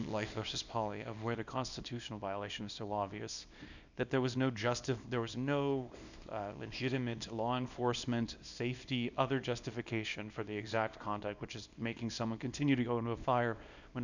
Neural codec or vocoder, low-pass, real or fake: codec, 24 kHz, 0.9 kbps, WavTokenizer, small release; 7.2 kHz; fake